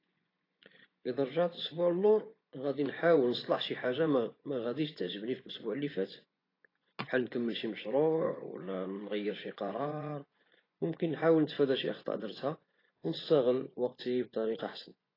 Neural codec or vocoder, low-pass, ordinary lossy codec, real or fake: vocoder, 24 kHz, 100 mel bands, Vocos; 5.4 kHz; AAC, 24 kbps; fake